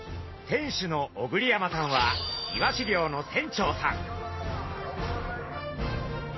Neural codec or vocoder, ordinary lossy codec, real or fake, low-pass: none; MP3, 24 kbps; real; 7.2 kHz